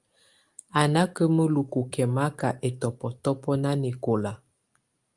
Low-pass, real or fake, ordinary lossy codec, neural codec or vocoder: 10.8 kHz; real; Opus, 32 kbps; none